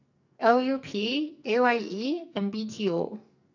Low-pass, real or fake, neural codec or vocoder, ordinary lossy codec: 7.2 kHz; fake; codec, 44.1 kHz, 2.6 kbps, SNAC; none